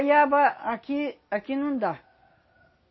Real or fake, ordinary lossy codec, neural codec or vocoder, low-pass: real; MP3, 24 kbps; none; 7.2 kHz